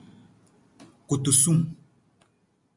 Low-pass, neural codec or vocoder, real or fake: 10.8 kHz; none; real